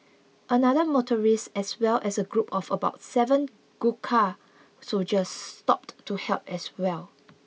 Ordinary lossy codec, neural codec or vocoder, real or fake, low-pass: none; none; real; none